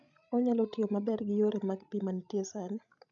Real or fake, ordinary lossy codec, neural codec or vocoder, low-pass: fake; none; codec, 16 kHz, 16 kbps, FreqCodec, larger model; 7.2 kHz